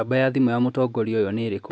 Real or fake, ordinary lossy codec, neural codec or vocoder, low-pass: real; none; none; none